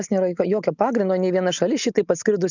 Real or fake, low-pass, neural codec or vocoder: real; 7.2 kHz; none